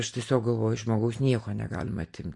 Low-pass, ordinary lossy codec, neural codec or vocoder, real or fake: 10.8 kHz; MP3, 48 kbps; none; real